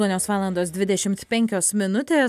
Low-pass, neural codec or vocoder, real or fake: 14.4 kHz; none; real